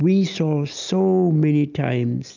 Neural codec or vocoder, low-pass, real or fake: none; 7.2 kHz; real